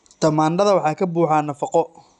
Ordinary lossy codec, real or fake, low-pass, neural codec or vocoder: none; real; 10.8 kHz; none